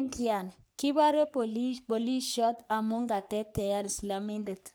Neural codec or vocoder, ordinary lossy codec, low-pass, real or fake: codec, 44.1 kHz, 3.4 kbps, Pupu-Codec; none; none; fake